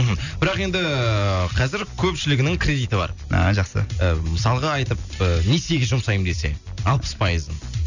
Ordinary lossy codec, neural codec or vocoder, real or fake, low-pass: none; none; real; 7.2 kHz